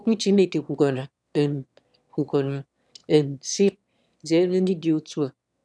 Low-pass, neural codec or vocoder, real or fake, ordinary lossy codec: none; autoencoder, 22.05 kHz, a latent of 192 numbers a frame, VITS, trained on one speaker; fake; none